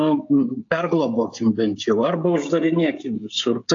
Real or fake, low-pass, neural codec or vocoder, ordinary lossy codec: fake; 7.2 kHz; codec, 16 kHz, 16 kbps, FreqCodec, smaller model; AAC, 48 kbps